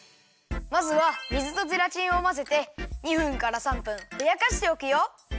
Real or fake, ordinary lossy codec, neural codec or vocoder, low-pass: real; none; none; none